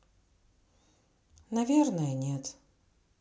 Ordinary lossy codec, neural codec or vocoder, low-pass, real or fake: none; none; none; real